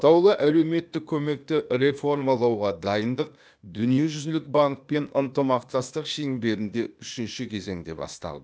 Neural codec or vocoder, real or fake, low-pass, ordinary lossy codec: codec, 16 kHz, 0.8 kbps, ZipCodec; fake; none; none